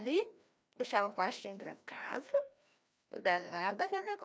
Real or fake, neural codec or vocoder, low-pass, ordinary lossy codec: fake; codec, 16 kHz, 1 kbps, FreqCodec, larger model; none; none